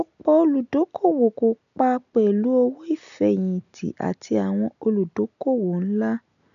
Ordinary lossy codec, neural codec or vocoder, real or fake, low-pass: none; none; real; 7.2 kHz